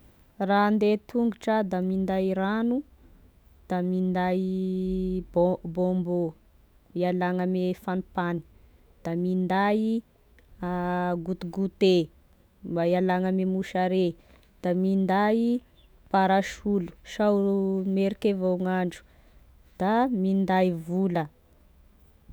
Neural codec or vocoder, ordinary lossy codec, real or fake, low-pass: autoencoder, 48 kHz, 128 numbers a frame, DAC-VAE, trained on Japanese speech; none; fake; none